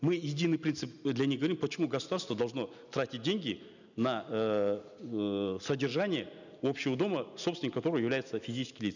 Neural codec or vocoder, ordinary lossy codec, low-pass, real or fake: none; none; 7.2 kHz; real